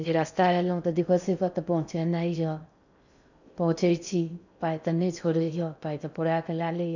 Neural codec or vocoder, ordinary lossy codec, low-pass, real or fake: codec, 16 kHz in and 24 kHz out, 0.6 kbps, FocalCodec, streaming, 4096 codes; none; 7.2 kHz; fake